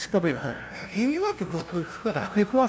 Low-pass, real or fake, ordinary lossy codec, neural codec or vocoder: none; fake; none; codec, 16 kHz, 0.5 kbps, FunCodec, trained on LibriTTS, 25 frames a second